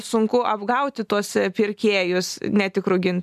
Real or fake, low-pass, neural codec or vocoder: real; 14.4 kHz; none